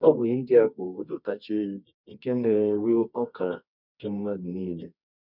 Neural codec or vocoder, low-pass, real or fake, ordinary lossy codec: codec, 24 kHz, 0.9 kbps, WavTokenizer, medium music audio release; 5.4 kHz; fake; none